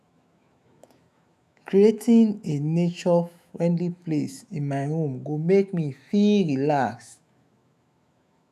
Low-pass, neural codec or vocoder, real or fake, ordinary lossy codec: 14.4 kHz; autoencoder, 48 kHz, 128 numbers a frame, DAC-VAE, trained on Japanese speech; fake; none